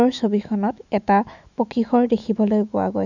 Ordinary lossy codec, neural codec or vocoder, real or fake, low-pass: none; autoencoder, 48 kHz, 128 numbers a frame, DAC-VAE, trained on Japanese speech; fake; 7.2 kHz